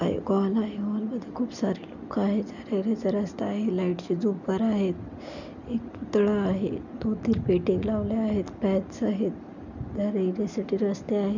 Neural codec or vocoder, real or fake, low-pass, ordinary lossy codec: none; real; 7.2 kHz; none